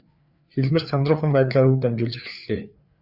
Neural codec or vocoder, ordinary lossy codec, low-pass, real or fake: codec, 16 kHz, 4 kbps, FreqCodec, larger model; Opus, 64 kbps; 5.4 kHz; fake